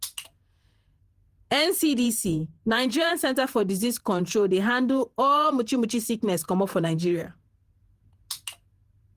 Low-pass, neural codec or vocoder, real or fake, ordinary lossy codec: 14.4 kHz; vocoder, 48 kHz, 128 mel bands, Vocos; fake; Opus, 24 kbps